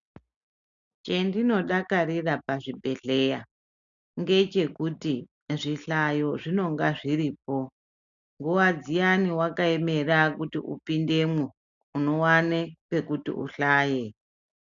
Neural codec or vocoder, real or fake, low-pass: none; real; 7.2 kHz